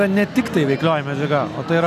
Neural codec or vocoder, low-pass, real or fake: none; 14.4 kHz; real